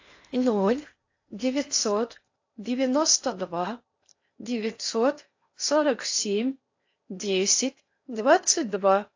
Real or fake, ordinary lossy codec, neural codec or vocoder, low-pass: fake; AAC, 48 kbps; codec, 16 kHz in and 24 kHz out, 0.8 kbps, FocalCodec, streaming, 65536 codes; 7.2 kHz